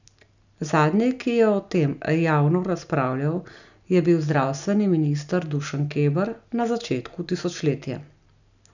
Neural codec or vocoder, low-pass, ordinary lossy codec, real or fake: none; 7.2 kHz; none; real